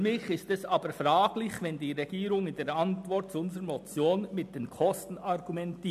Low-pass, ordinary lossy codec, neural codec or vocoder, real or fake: 14.4 kHz; MP3, 96 kbps; none; real